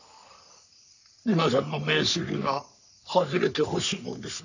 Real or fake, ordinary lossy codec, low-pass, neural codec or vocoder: fake; none; 7.2 kHz; codec, 44.1 kHz, 3.4 kbps, Pupu-Codec